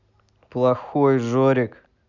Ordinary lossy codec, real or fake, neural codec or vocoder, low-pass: none; real; none; 7.2 kHz